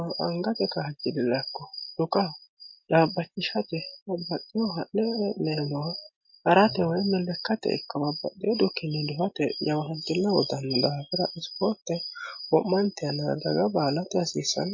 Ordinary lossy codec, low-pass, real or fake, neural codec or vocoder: MP3, 32 kbps; 7.2 kHz; real; none